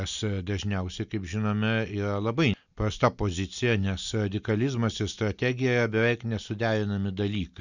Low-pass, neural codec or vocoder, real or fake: 7.2 kHz; none; real